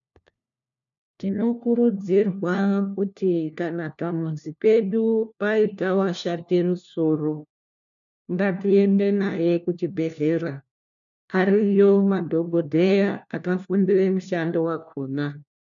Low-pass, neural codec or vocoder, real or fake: 7.2 kHz; codec, 16 kHz, 1 kbps, FunCodec, trained on LibriTTS, 50 frames a second; fake